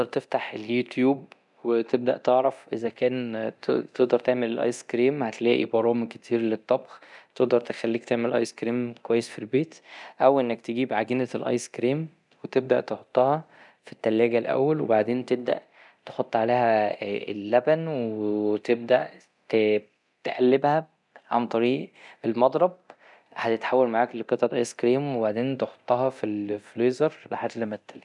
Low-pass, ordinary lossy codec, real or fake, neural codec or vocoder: 10.8 kHz; none; fake; codec, 24 kHz, 0.9 kbps, DualCodec